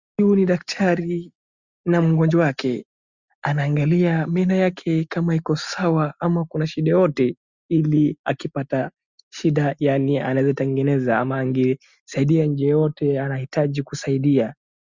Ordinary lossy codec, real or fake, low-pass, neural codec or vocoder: Opus, 64 kbps; real; 7.2 kHz; none